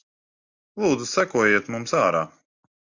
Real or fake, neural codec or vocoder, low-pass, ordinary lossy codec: real; none; 7.2 kHz; Opus, 64 kbps